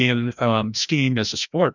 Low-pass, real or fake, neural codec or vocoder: 7.2 kHz; fake; codec, 16 kHz, 1 kbps, FreqCodec, larger model